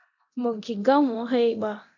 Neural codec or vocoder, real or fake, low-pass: codec, 24 kHz, 0.9 kbps, DualCodec; fake; 7.2 kHz